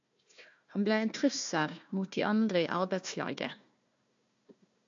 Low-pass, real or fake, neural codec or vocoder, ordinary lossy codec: 7.2 kHz; fake; codec, 16 kHz, 1 kbps, FunCodec, trained on Chinese and English, 50 frames a second; AAC, 64 kbps